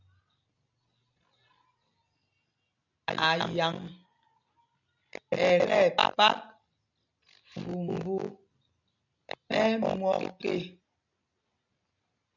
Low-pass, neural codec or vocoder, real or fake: 7.2 kHz; none; real